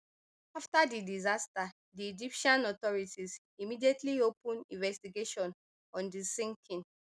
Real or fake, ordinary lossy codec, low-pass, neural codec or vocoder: real; none; none; none